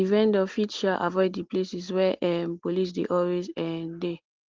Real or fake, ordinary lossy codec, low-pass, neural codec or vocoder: real; Opus, 16 kbps; 7.2 kHz; none